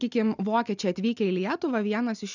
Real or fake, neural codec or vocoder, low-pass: real; none; 7.2 kHz